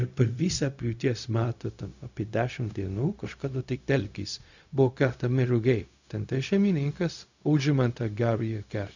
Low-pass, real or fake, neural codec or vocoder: 7.2 kHz; fake; codec, 16 kHz, 0.4 kbps, LongCat-Audio-Codec